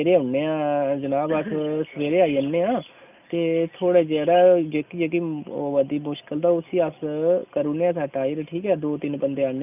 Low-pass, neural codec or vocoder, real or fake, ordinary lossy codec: 3.6 kHz; none; real; none